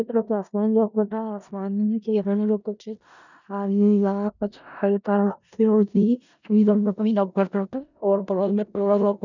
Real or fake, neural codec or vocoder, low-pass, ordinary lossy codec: fake; codec, 16 kHz in and 24 kHz out, 0.4 kbps, LongCat-Audio-Codec, four codebook decoder; 7.2 kHz; none